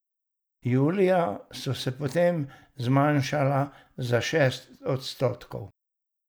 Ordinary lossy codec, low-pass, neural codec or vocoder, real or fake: none; none; none; real